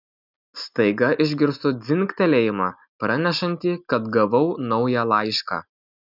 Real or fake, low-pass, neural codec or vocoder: real; 5.4 kHz; none